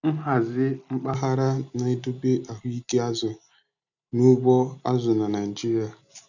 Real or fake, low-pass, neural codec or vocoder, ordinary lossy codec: real; 7.2 kHz; none; none